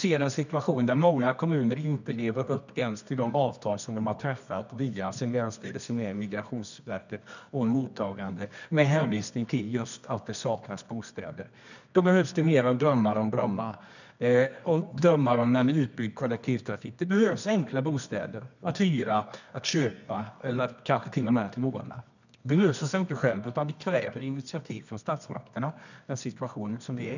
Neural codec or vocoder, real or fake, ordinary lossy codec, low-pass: codec, 24 kHz, 0.9 kbps, WavTokenizer, medium music audio release; fake; none; 7.2 kHz